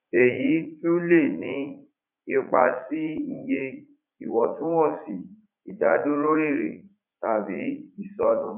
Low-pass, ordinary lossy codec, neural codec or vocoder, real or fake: 3.6 kHz; none; vocoder, 44.1 kHz, 80 mel bands, Vocos; fake